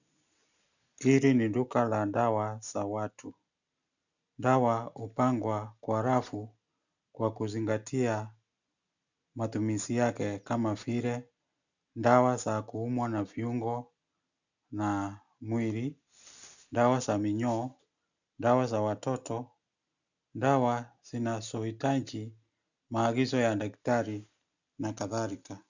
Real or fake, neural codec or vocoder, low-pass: real; none; 7.2 kHz